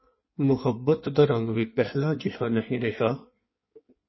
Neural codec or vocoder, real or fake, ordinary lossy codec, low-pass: codec, 16 kHz in and 24 kHz out, 1.1 kbps, FireRedTTS-2 codec; fake; MP3, 24 kbps; 7.2 kHz